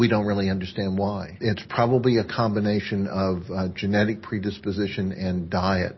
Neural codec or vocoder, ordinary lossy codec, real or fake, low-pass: none; MP3, 24 kbps; real; 7.2 kHz